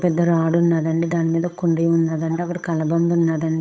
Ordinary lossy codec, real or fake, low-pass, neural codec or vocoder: none; fake; none; codec, 16 kHz, 8 kbps, FunCodec, trained on Chinese and English, 25 frames a second